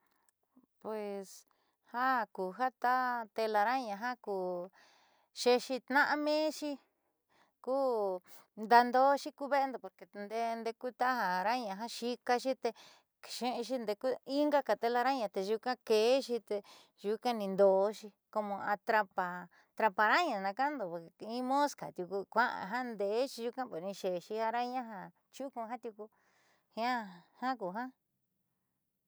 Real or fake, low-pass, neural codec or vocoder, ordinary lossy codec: real; none; none; none